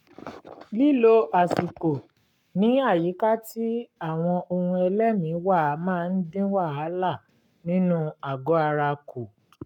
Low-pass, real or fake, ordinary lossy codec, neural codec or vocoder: 19.8 kHz; fake; none; codec, 44.1 kHz, 7.8 kbps, Pupu-Codec